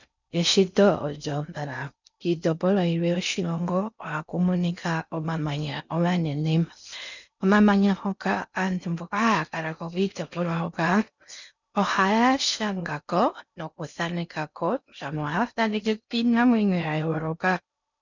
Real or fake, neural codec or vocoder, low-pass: fake; codec, 16 kHz in and 24 kHz out, 0.6 kbps, FocalCodec, streaming, 4096 codes; 7.2 kHz